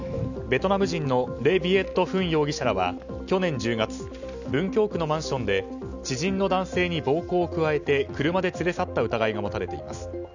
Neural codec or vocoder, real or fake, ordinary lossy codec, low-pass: none; real; none; 7.2 kHz